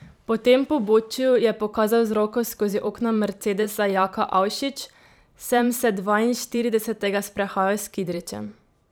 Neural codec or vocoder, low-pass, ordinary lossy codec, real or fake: vocoder, 44.1 kHz, 128 mel bands every 512 samples, BigVGAN v2; none; none; fake